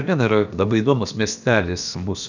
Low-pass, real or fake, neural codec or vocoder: 7.2 kHz; fake; codec, 16 kHz, about 1 kbps, DyCAST, with the encoder's durations